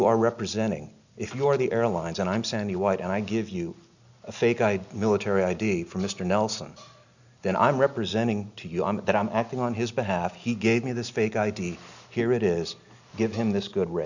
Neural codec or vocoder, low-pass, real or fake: none; 7.2 kHz; real